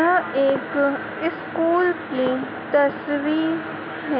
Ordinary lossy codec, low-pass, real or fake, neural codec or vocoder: none; 5.4 kHz; real; none